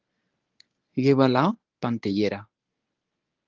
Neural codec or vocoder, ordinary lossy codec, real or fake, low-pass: codec, 24 kHz, 0.9 kbps, WavTokenizer, medium speech release version 1; Opus, 24 kbps; fake; 7.2 kHz